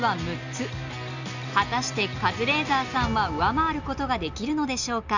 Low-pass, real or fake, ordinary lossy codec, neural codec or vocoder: 7.2 kHz; real; none; none